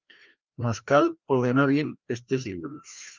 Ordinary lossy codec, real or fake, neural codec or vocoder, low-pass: Opus, 32 kbps; fake; codec, 16 kHz, 1 kbps, FreqCodec, larger model; 7.2 kHz